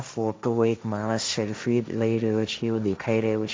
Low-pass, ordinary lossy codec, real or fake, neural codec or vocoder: none; none; fake; codec, 16 kHz, 1.1 kbps, Voila-Tokenizer